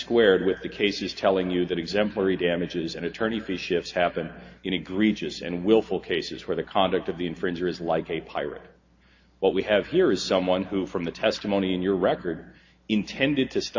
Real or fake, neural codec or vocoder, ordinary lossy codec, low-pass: real; none; AAC, 48 kbps; 7.2 kHz